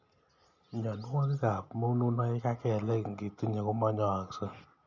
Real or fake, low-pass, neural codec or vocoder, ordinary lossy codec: real; 7.2 kHz; none; none